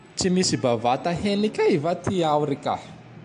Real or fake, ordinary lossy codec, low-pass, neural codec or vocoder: real; AAC, 64 kbps; 9.9 kHz; none